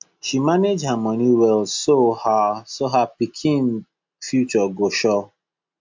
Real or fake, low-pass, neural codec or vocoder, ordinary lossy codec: real; 7.2 kHz; none; MP3, 64 kbps